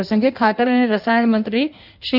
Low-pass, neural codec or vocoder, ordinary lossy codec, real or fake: 5.4 kHz; codec, 16 kHz in and 24 kHz out, 1.1 kbps, FireRedTTS-2 codec; none; fake